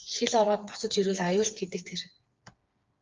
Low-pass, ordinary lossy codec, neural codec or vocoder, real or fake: 7.2 kHz; Opus, 32 kbps; codec, 16 kHz, 4 kbps, FreqCodec, smaller model; fake